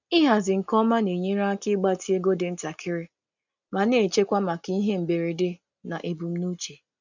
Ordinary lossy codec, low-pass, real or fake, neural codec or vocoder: none; 7.2 kHz; real; none